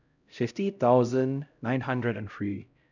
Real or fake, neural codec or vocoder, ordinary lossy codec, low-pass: fake; codec, 16 kHz, 0.5 kbps, X-Codec, HuBERT features, trained on LibriSpeech; none; 7.2 kHz